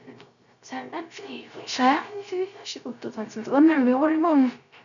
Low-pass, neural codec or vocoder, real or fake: 7.2 kHz; codec, 16 kHz, 0.3 kbps, FocalCodec; fake